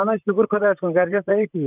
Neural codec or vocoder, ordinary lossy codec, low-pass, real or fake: vocoder, 44.1 kHz, 128 mel bands, Pupu-Vocoder; none; 3.6 kHz; fake